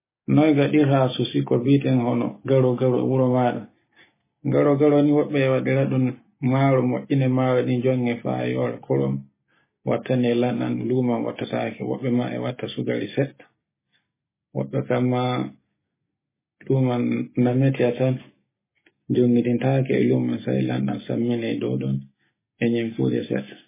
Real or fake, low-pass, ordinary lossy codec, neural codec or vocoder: real; 3.6 kHz; MP3, 16 kbps; none